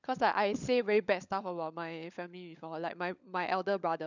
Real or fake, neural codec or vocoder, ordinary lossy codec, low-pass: fake; codec, 16 kHz, 16 kbps, FunCodec, trained on LibriTTS, 50 frames a second; none; 7.2 kHz